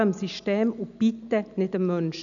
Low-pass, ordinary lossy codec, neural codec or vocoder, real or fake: 7.2 kHz; none; none; real